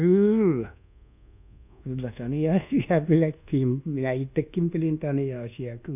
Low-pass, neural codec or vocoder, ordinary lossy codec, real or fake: 3.6 kHz; codec, 24 kHz, 1.2 kbps, DualCodec; none; fake